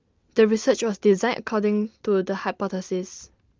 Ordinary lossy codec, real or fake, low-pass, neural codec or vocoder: Opus, 32 kbps; real; 7.2 kHz; none